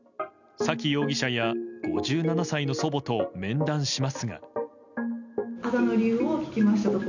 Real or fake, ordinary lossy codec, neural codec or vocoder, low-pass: real; none; none; 7.2 kHz